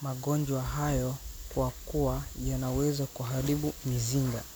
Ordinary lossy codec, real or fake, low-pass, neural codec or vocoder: none; real; none; none